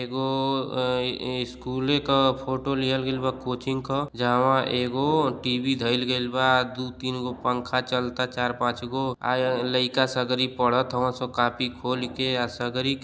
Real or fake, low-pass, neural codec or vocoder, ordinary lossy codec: real; none; none; none